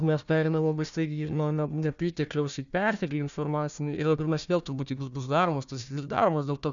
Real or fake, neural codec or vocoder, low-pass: fake; codec, 16 kHz, 1 kbps, FunCodec, trained on Chinese and English, 50 frames a second; 7.2 kHz